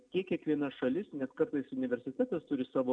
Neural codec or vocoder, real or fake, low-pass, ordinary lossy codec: none; real; 9.9 kHz; MP3, 96 kbps